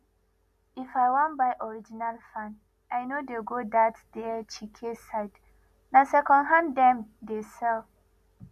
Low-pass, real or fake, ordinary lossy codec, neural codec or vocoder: 14.4 kHz; real; none; none